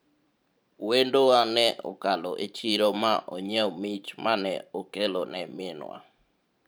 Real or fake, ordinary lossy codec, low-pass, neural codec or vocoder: fake; none; none; vocoder, 44.1 kHz, 128 mel bands every 512 samples, BigVGAN v2